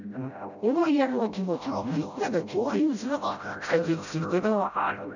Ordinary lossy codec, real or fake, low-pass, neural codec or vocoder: none; fake; 7.2 kHz; codec, 16 kHz, 0.5 kbps, FreqCodec, smaller model